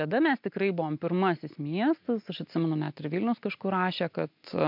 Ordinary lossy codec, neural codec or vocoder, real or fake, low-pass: MP3, 48 kbps; none; real; 5.4 kHz